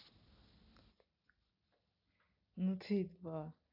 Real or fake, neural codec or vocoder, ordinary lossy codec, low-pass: real; none; AAC, 32 kbps; 5.4 kHz